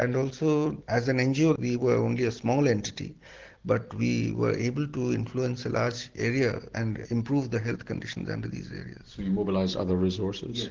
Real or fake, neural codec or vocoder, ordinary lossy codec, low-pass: real; none; Opus, 16 kbps; 7.2 kHz